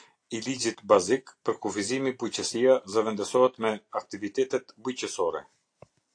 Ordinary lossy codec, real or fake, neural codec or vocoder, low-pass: AAC, 48 kbps; real; none; 9.9 kHz